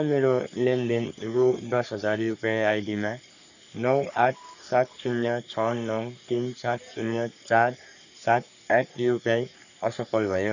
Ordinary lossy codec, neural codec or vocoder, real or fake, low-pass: none; codec, 44.1 kHz, 3.4 kbps, Pupu-Codec; fake; 7.2 kHz